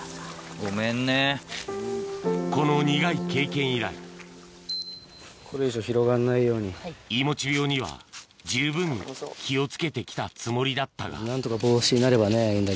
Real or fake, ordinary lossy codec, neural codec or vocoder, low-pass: real; none; none; none